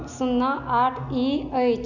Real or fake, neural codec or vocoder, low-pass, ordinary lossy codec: real; none; 7.2 kHz; none